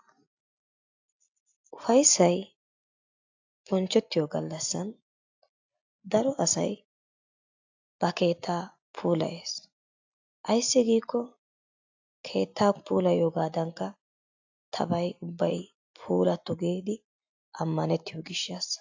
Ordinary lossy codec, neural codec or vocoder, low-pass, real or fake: AAC, 48 kbps; none; 7.2 kHz; real